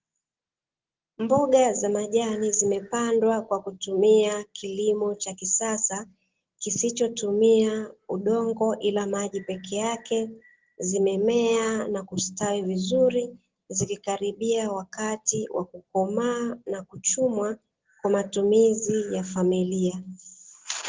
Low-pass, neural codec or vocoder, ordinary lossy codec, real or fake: 7.2 kHz; none; Opus, 16 kbps; real